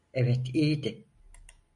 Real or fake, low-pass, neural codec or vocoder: real; 10.8 kHz; none